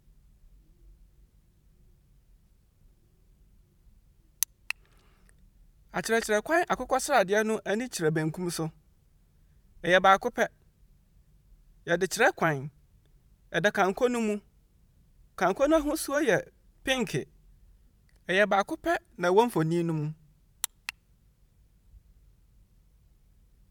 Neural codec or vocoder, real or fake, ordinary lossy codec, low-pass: vocoder, 44.1 kHz, 128 mel bands every 512 samples, BigVGAN v2; fake; none; 19.8 kHz